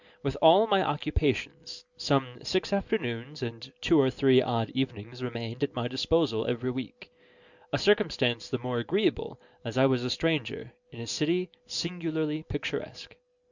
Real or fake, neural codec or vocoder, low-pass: real; none; 7.2 kHz